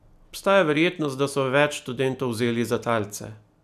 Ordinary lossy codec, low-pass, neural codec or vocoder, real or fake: none; 14.4 kHz; none; real